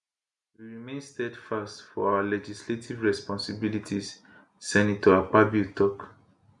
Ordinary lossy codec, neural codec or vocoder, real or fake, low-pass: none; none; real; 10.8 kHz